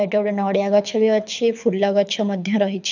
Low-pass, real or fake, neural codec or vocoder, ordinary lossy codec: 7.2 kHz; fake; codec, 24 kHz, 6 kbps, HILCodec; none